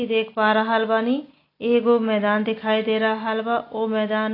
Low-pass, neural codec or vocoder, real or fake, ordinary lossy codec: 5.4 kHz; none; real; AAC, 24 kbps